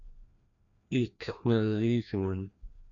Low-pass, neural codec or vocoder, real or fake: 7.2 kHz; codec, 16 kHz, 1 kbps, FreqCodec, larger model; fake